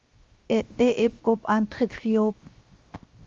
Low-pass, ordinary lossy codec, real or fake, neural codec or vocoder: 7.2 kHz; Opus, 32 kbps; fake; codec, 16 kHz, 0.7 kbps, FocalCodec